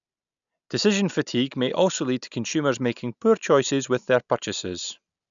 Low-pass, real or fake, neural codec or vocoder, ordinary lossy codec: 7.2 kHz; real; none; none